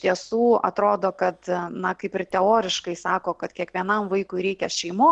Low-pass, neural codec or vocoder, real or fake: 10.8 kHz; none; real